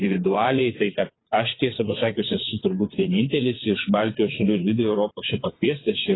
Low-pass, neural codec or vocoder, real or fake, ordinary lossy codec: 7.2 kHz; vocoder, 22.05 kHz, 80 mel bands, WaveNeXt; fake; AAC, 16 kbps